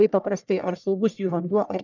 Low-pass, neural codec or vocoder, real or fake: 7.2 kHz; codec, 44.1 kHz, 1.7 kbps, Pupu-Codec; fake